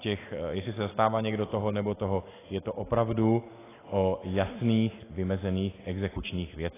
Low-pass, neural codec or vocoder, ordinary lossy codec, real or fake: 3.6 kHz; none; AAC, 16 kbps; real